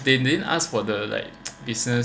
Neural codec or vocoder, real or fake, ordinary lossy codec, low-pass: none; real; none; none